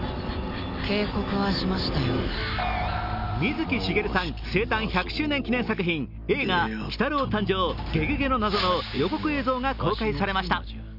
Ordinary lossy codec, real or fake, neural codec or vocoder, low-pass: none; real; none; 5.4 kHz